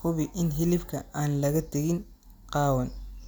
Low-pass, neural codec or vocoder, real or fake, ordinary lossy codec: none; none; real; none